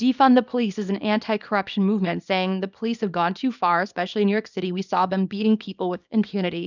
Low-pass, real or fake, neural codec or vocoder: 7.2 kHz; fake; codec, 24 kHz, 0.9 kbps, WavTokenizer, small release